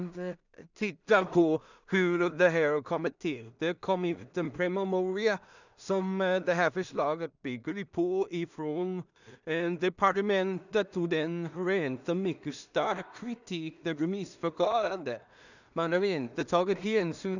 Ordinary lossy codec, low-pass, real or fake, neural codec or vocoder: none; 7.2 kHz; fake; codec, 16 kHz in and 24 kHz out, 0.4 kbps, LongCat-Audio-Codec, two codebook decoder